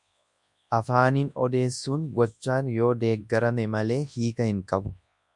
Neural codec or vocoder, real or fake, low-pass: codec, 24 kHz, 0.9 kbps, WavTokenizer, large speech release; fake; 10.8 kHz